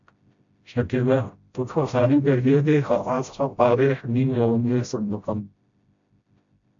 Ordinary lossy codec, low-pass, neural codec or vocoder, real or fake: MP3, 64 kbps; 7.2 kHz; codec, 16 kHz, 0.5 kbps, FreqCodec, smaller model; fake